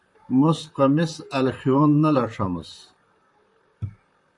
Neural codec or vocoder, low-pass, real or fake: vocoder, 44.1 kHz, 128 mel bands, Pupu-Vocoder; 10.8 kHz; fake